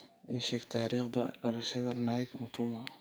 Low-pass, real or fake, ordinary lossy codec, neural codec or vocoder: none; fake; none; codec, 44.1 kHz, 2.6 kbps, SNAC